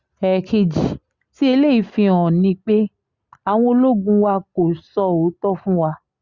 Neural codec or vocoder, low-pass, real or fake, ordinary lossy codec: none; 7.2 kHz; real; Opus, 64 kbps